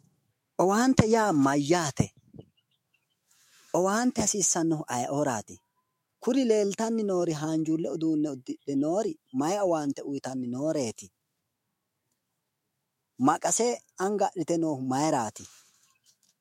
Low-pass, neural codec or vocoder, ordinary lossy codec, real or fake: 19.8 kHz; autoencoder, 48 kHz, 128 numbers a frame, DAC-VAE, trained on Japanese speech; MP3, 64 kbps; fake